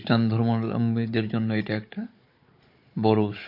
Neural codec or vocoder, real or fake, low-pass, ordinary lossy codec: codec, 16 kHz, 16 kbps, FunCodec, trained on Chinese and English, 50 frames a second; fake; 5.4 kHz; MP3, 32 kbps